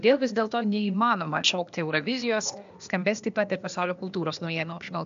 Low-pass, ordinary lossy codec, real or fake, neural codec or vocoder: 7.2 kHz; MP3, 64 kbps; fake; codec, 16 kHz, 0.8 kbps, ZipCodec